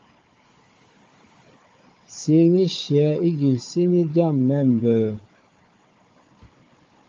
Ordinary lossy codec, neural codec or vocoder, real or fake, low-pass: Opus, 32 kbps; codec, 16 kHz, 4 kbps, FunCodec, trained on Chinese and English, 50 frames a second; fake; 7.2 kHz